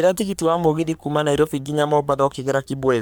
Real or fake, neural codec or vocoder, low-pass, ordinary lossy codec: fake; codec, 44.1 kHz, 3.4 kbps, Pupu-Codec; none; none